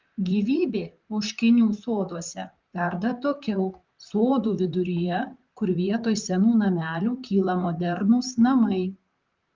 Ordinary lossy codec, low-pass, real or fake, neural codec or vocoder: Opus, 24 kbps; 7.2 kHz; fake; vocoder, 44.1 kHz, 128 mel bands, Pupu-Vocoder